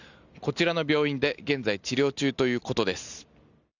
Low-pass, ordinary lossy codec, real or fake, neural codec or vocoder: 7.2 kHz; none; real; none